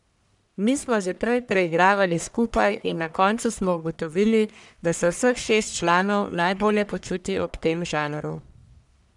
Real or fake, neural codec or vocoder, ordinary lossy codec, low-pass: fake; codec, 44.1 kHz, 1.7 kbps, Pupu-Codec; none; 10.8 kHz